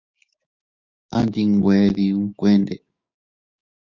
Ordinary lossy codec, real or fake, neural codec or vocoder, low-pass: Opus, 64 kbps; fake; codec, 16 kHz, 6 kbps, DAC; 7.2 kHz